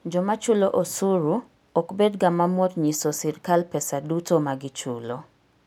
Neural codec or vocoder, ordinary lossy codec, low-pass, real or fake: none; none; none; real